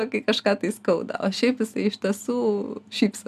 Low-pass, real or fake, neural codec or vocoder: 14.4 kHz; real; none